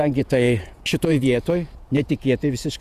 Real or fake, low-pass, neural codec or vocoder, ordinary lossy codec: fake; 14.4 kHz; vocoder, 48 kHz, 128 mel bands, Vocos; Opus, 64 kbps